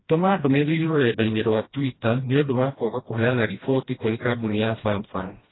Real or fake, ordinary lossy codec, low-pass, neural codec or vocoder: fake; AAC, 16 kbps; 7.2 kHz; codec, 16 kHz, 1 kbps, FreqCodec, smaller model